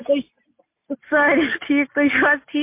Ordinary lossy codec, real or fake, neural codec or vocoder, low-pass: MP3, 24 kbps; real; none; 3.6 kHz